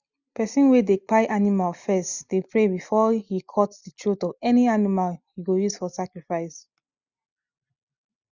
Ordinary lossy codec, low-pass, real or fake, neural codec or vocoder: none; 7.2 kHz; real; none